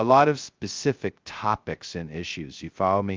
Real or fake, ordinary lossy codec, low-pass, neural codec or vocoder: fake; Opus, 32 kbps; 7.2 kHz; codec, 16 kHz, 0.2 kbps, FocalCodec